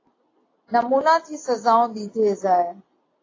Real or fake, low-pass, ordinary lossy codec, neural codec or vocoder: real; 7.2 kHz; AAC, 32 kbps; none